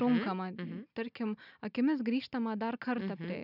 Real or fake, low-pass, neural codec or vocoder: real; 5.4 kHz; none